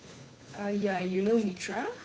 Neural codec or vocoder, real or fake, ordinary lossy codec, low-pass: codec, 16 kHz, 2 kbps, FunCodec, trained on Chinese and English, 25 frames a second; fake; none; none